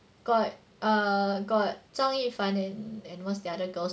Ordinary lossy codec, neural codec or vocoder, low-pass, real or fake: none; none; none; real